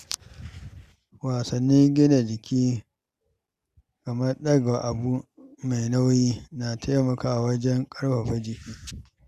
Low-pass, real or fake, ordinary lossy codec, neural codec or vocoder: 14.4 kHz; real; Opus, 64 kbps; none